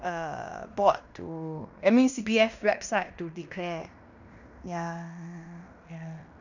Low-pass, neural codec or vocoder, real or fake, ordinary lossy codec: 7.2 kHz; codec, 16 kHz in and 24 kHz out, 0.9 kbps, LongCat-Audio-Codec, fine tuned four codebook decoder; fake; none